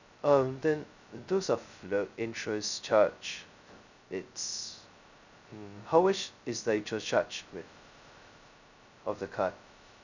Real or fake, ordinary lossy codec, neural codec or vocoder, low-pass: fake; none; codec, 16 kHz, 0.2 kbps, FocalCodec; 7.2 kHz